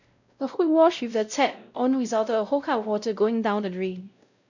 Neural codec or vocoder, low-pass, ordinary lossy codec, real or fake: codec, 16 kHz, 0.5 kbps, X-Codec, WavLM features, trained on Multilingual LibriSpeech; 7.2 kHz; none; fake